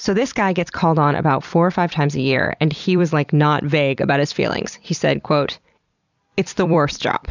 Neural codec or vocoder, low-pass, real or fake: vocoder, 44.1 kHz, 128 mel bands every 256 samples, BigVGAN v2; 7.2 kHz; fake